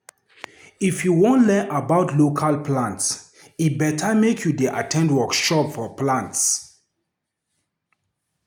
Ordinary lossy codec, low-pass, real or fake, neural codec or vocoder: none; none; real; none